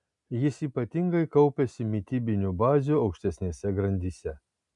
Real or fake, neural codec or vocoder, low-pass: real; none; 10.8 kHz